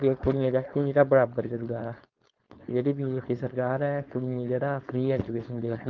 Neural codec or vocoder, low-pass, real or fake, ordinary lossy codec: codec, 16 kHz, 4.8 kbps, FACodec; 7.2 kHz; fake; Opus, 32 kbps